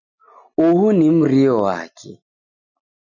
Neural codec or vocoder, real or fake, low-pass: none; real; 7.2 kHz